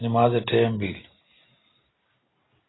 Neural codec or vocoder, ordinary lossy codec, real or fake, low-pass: none; AAC, 16 kbps; real; 7.2 kHz